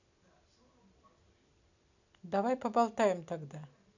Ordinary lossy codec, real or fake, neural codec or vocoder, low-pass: none; real; none; 7.2 kHz